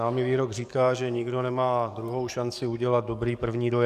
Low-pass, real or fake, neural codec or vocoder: 14.4 kHz; fake; codec, 44.1 kHz, 7.8 kbps, DAC